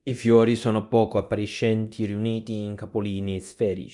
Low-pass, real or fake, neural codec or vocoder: 10.8 kHz; fake; codec, 24 kHz, 0.9 kbps, DualCodec